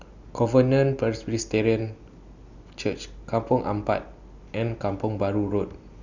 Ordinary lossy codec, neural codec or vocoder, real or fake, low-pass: none; none; real; 7.2 kHz